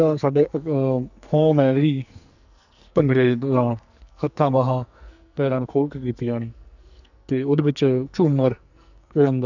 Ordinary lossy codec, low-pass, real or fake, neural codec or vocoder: none; 7.2 kHz; fake; codec, 44.1 kHz, 2.6 kbps, SNAC